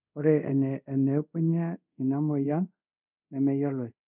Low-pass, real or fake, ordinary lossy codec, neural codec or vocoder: 3.6 kHz; fake; none; codec, 24 kHz, 0.5 kbps, DualCodec